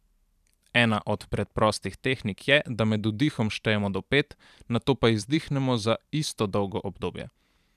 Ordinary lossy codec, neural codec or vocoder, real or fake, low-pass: none; vocoder, 44.1 kHz, 128 mel bands every 512 samples, BigVGAN v2; fake; 14.4 kHz